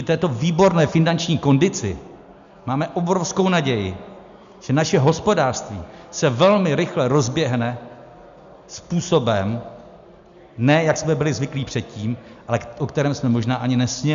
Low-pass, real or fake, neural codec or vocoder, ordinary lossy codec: 7.2 kHz; real; none; MP3, 64 kbps